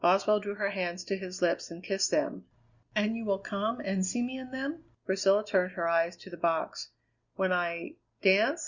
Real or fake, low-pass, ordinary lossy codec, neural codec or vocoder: real; 7.2 kHz; Opus, 64 kbps; none